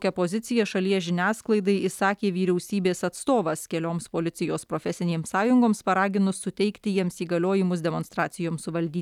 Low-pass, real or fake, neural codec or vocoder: 19.8 kHz; real; none